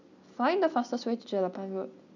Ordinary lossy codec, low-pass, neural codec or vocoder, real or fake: none; 7.2 kHz; none; real